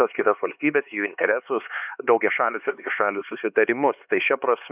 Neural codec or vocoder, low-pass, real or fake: codec, 16 kHz, 4 kbps, X-Codec, HuBERT features, trained on LibriSpeech; 3.6 kHz; fake